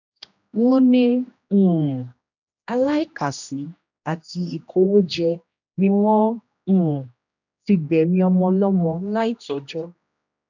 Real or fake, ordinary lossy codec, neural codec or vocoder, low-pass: fake; none; codec, 16 kHz, 1 kbps, X-Codec, HuBERT features, trained on general audio; 7.2 kHz